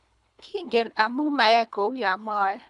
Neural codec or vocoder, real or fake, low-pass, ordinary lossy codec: codec, 24 kHz, 3 kbps, HILCodec; fake; 10.8 kHz; none